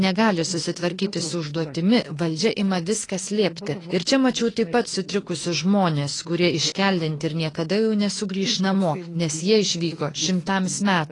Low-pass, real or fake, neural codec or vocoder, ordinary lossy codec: 10.8 kHz; fake; autoencoder, 48 kHz, 32 numbers a frame, DAC-VAE, trained on Japanese speech; AAC, 32 kbps